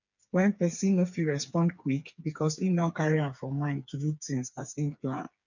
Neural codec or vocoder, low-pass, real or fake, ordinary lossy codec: codec, 16 kHz, 4 kbps, FreqCodec, smaller model; 7.2 kHz; fake; none